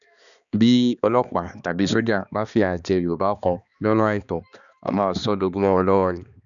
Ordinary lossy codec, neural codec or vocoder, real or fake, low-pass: none; codec, 16 kHz, 2 kbps, X-Codec, HuBERT features, trained on balanced general audio; fake; 7.2 kHz